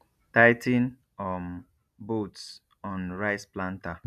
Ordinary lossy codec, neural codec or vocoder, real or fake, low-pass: none; none; real; 14.4 kHz